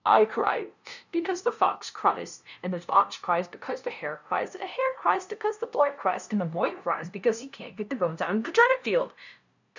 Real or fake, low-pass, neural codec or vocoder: fake; 7.2 kHz; codec, 16 kHz, 0.5 kbps, FunCodec, trained on LibriTTS, 25 frames a second